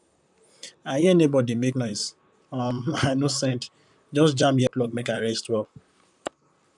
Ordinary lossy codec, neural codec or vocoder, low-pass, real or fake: none; vocoder, 44.1 kHz, 128 mel bands, Pupu-Vocoder; 10.8 kHz; fake